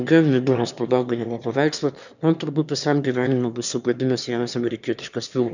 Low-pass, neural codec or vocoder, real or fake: 7.2 kHz; autoencoder, 22.05 kHz, a latent of 192 numbers a frame, VITS, trained on one speaker; fake